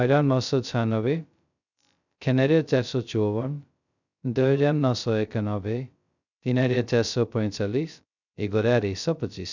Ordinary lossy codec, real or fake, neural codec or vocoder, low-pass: none; fake; codec, 16 kHz, 0.2 kbps, FocalCodec; 7.2 kHz